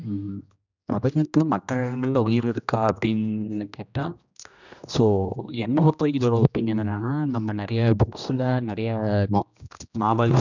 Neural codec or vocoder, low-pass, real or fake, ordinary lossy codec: codec, 16 kHz, 1 kbps, X-Codec, HuBERT features, trained on general audio; 7.2 kHz; fake; none